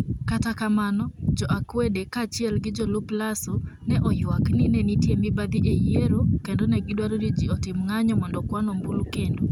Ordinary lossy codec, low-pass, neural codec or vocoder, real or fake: none; 19.8 kHz; none; real